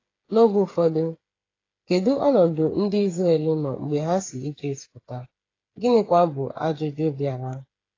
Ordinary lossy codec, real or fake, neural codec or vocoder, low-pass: AAC, 32 kbps; fake; codec, 16 kHz, 8 kbps, FreqCodec, smaller model; 7.2 kHz